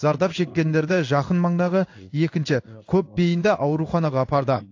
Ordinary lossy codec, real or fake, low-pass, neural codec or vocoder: AAC, 48 kbps; fake; 7.2 kHz; codec, 16 kHz in and 24 kHz out, 1 kbps, XY-Tokenizer